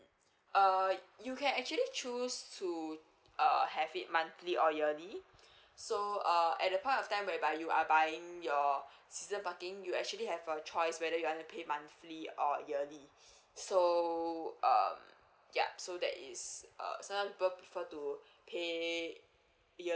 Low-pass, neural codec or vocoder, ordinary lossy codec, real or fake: none; none; none; real